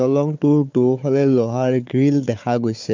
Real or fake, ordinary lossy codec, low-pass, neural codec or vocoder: fake; MP3, 64 kbps; 7.2 kHz; codec, 16 kHz, 4 kbps, FunCodec, trained on Chinese and English, 50 frames a second